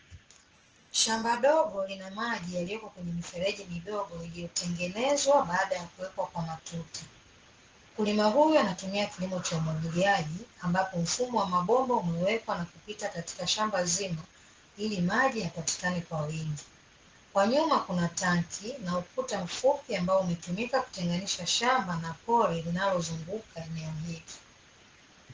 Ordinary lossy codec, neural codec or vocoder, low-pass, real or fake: Opus, 16 kbps; none; 7.2 kHz; real